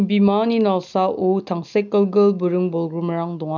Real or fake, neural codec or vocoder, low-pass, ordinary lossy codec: real; none; 7.2 kHz; none